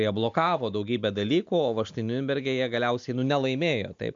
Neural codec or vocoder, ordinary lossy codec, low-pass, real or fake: none; AAC, 64 kbps; 7.2 kHz; real